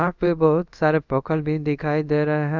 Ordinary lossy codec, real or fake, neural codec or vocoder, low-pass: none; fake; codec, 24 kHz, 0.5 kbps, DualCodec; 7.2 kHz